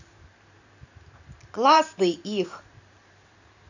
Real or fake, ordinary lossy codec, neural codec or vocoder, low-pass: fake; none; vocoder, 44.1 kHz, 128 mel bands every 512 samples, BigVGAN v2; 7.2 kHz